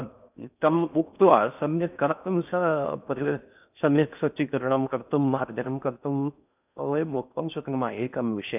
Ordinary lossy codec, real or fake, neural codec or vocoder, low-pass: none; fake; codec, 16 kHz in and 24 kHz out, 0.6 kbps, FocalCodec, streaming, 4096 codes; 3.6 kHz